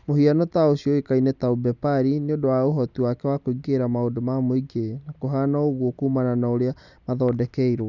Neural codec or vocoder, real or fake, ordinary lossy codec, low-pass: none; real; none; 7.2 kHz